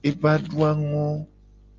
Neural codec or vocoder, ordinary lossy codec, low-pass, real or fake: none; Opus, 24 kbps; 7.2 kHz; real